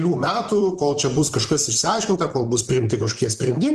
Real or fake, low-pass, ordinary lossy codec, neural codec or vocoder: fake; 14.4 kHz; Opus, 24 kbps; vocoder, 44.1 kHz, 128 mel bands, Pupu-Vocoder